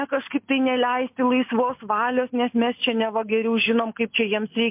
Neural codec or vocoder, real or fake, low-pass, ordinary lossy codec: none; real; 3.6 kHz; MP3, 32 kbps